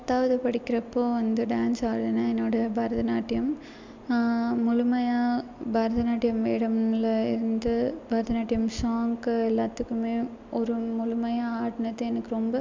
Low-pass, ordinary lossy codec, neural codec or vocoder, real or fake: 7.2 kHz; MP3, 64 kbps; none; real